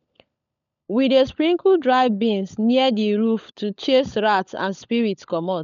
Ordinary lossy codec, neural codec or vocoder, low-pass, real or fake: none; codec, 16 kHz, 16 kbps, FunCodec, trained on LibriTTS, 50 frames a second; 7.2 kHz; fake